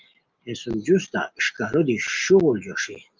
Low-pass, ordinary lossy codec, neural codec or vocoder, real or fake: 7.2 kHz; Opus, 24 kbps; none; real